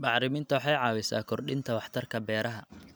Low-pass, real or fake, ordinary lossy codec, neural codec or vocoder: none; real; none; none